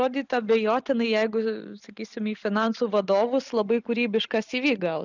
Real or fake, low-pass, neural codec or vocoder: real; 7.2 kHz; none